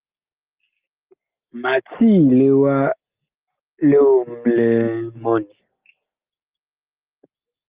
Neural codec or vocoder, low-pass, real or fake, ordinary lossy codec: none; 3.6 kHz; real; Opus, 32 kbps